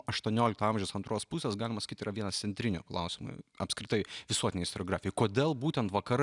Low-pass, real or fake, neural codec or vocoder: 10.8 kHz; real; none